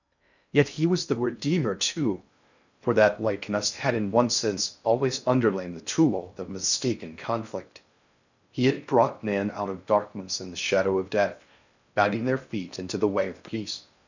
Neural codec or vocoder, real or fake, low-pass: codec, 16 kHz in and 24 kHz out, 0.6 kbps, FocalCodec, streaming, 2048 codes; fake; 7.2 kHz